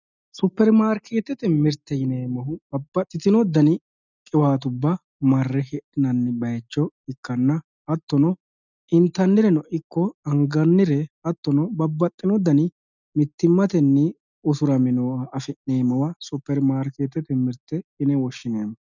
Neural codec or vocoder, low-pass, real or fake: none; 7.2 kHz; real